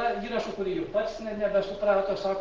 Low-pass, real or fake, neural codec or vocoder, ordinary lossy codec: 7.2 kHz; real; none; Opus, 16 kbps